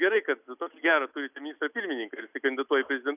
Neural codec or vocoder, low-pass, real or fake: none; 3.6 kHz; real